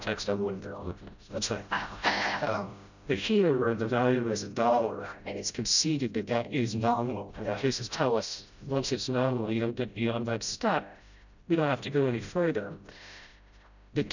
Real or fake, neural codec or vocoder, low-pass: fake; codec, 16 kHz, 0.5 kbps, FreqCodec, smaller model; 7.2 kHz